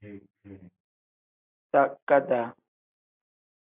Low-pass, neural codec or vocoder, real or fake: 3.6 kHz; none; real